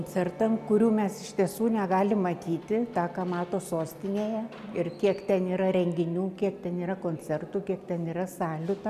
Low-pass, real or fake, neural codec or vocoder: 14.4 kHz; real; none